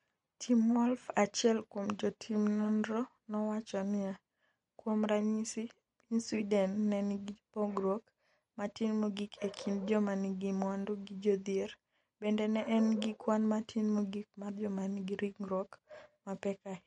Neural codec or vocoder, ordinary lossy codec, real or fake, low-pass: none; MP3, 48 kbps; real; 19.8 kHz